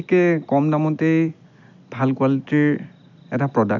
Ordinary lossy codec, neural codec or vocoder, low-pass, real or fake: none; none; 7.2 kHz; real